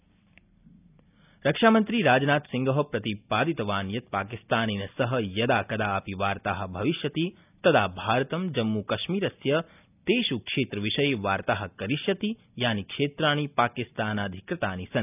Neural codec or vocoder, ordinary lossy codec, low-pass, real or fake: none; none; 3.6 kHz; real